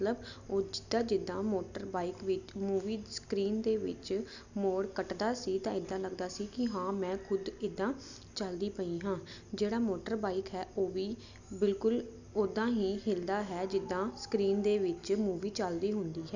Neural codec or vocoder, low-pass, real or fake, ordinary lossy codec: none; 7.2 kHz; real; none